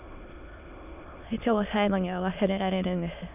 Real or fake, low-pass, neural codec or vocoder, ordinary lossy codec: fake; 3.6 kHz; autoencoder, 22.05 kHz, a latent of 192 numbers a frame, VITS, trained on many speakers; none